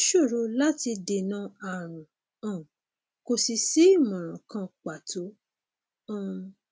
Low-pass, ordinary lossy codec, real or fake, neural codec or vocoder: none; none; real; none